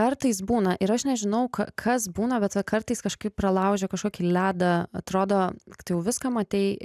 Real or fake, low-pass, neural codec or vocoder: real; 14.4 kHz; none